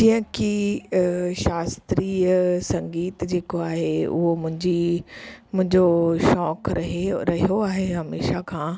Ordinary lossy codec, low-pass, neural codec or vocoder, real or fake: none; none; none; real